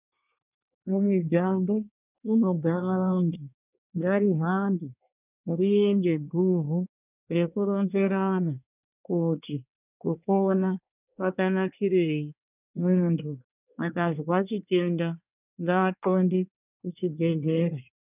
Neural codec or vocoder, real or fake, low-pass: codec, 24 kHz, 1 kbps, SNAC; fake; 3.6 kHz